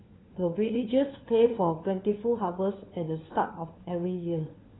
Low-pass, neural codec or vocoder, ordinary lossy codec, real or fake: 7.2 kHz; codec, 16 kHz, 4 kbps, FunCodec, trained on LibriTTS, 50 frames a second; AAC, 16 kbps; fake